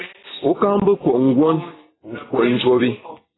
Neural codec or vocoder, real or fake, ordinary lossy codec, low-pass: vocoder, 44.1 kHz, 128 mel bands every 256 samples, BigVGAN v2; fake; AAC, 16 kbps; 7.2 kHz